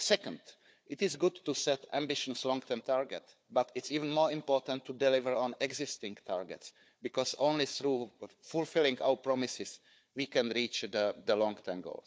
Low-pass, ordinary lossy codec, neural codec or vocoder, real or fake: none; none; codec, 16 kHz, 4 kbps, FunCodec, trained on Chinese and English, 50 frames a second; fake